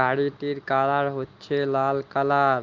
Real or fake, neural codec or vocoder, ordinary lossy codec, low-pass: real; none; Opus, 24 kbps; 7.2 kHz